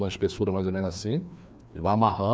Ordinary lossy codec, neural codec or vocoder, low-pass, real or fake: none; codec, 16 kHz, 2 kbps, FreqCodec, larger model; none; fake